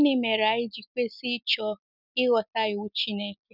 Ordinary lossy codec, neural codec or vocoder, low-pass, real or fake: none; none; 5.4 kHz; real